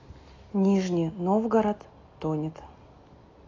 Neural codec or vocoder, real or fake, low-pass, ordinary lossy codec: none; real; 7.2 kHz; AAC, 32 kbps